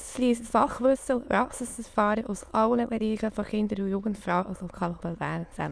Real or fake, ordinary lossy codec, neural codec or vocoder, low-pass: fake; none; autoencoder, 22.05 kHz, a latent of 192 numbers a frame, VITS, trained on many speakers; none